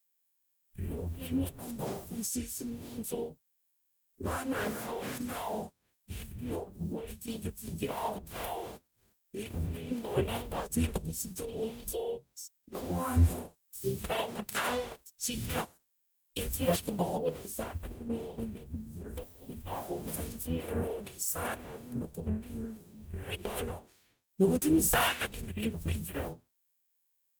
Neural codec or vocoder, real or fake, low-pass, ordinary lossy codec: codec, 44.1 kHz, 0.9 kbps, DAC; fake; none; none